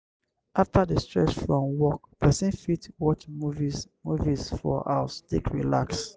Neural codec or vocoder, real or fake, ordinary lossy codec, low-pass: none; real; none; none